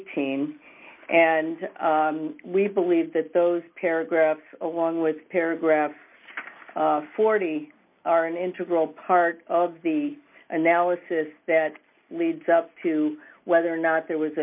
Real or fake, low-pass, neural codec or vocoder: real; 3.6 kHz; none